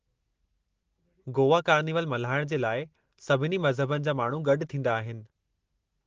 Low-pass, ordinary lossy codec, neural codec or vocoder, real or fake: 7.2 kHz; Opus, 16 kbps; none; real